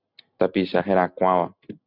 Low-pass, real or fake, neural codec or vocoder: 5.4 kHz; real; none